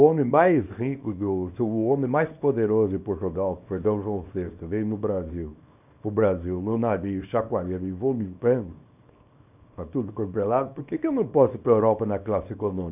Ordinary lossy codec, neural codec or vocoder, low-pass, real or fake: none; codec, 24 kHz, 0.9 kbps, WavTokenizer, small release; 3.6 kHz; fake